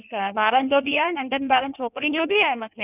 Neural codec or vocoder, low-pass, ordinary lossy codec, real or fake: codec, 16 kHz in and 24 kHz out, 1.1 kbps, FireRedTTS-2 codec; 3.6 kHz; none; fake